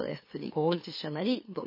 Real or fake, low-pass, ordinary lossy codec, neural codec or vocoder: fake; 5.4 kHz; MP3, 24 kbps; autoencoder, 44.1 kHz, a latent of 192 numbers a frame, MeloTTS